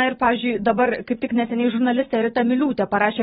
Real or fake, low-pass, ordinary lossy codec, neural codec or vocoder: real; 7.2 kHz; AAC, 16 kbps; none